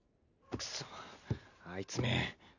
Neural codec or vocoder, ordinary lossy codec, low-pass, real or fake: none; none; 7.2 kHz; real